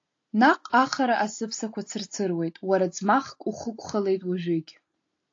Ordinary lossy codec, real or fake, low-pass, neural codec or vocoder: AAC, 48 kbps; real; 7.2 kHz; none